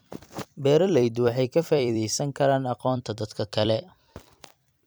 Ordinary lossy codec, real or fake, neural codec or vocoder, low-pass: none; fake; vocoder, 44.1 kHz, 128 mel bands every 256 samples, BigVGAN v2; none